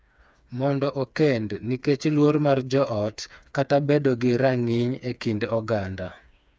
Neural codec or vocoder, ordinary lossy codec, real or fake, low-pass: codec, 16 kHz, 4 kbps, FreqCodec, smaller model; none; fake; none